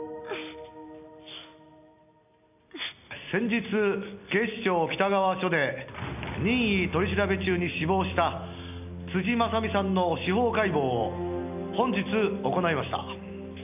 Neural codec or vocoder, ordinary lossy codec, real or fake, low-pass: none; none; real; 3.6 kHz